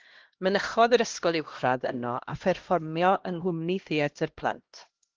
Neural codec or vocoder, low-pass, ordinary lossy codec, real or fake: codec, 16 kHz, 1 kbps, X-Codec, HuBERT features, trained on LibriSpeech; 7.2 kHz; Opus, 16 kbps; fake